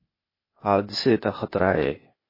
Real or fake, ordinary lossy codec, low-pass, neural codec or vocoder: fake; MP3, 24 kbps; 5.4 kHz; codec, 16 kHz, 0.8 kbps, ZipCodec